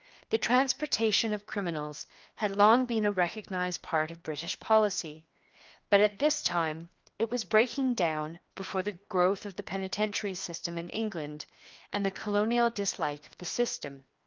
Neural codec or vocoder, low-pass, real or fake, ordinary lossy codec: codec, 16 kHz, 2 kbps, FreqCodec, larger model; 7.2 kHz; fake; Opus, 32 kbps